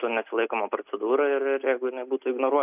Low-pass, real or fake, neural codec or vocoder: 3.6 kHz; real; none